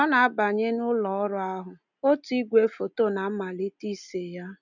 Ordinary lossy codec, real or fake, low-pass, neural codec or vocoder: none; real; 7.2 kHz; none